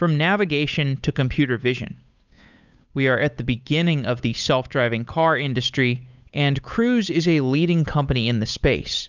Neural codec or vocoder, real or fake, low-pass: codec, 16 kHz, 8 kbps, FunCodec, trained on Chinese and English, 25 frames a second; fake; 7.2 kHz